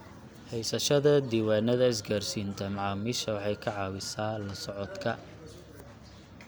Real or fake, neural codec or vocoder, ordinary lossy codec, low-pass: real; none; none; none